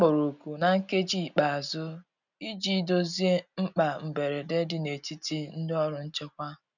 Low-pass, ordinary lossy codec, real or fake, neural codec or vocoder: 7.2 kHz; none; real; none